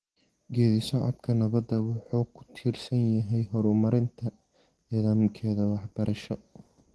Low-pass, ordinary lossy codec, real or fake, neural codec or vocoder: 10.8 kHz; Opus, 16 kbps; real; none